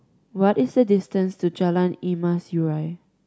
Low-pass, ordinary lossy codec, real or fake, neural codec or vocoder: none; none; real; none